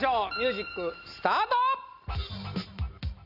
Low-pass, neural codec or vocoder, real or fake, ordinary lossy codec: 5.4 kHz; none; real; none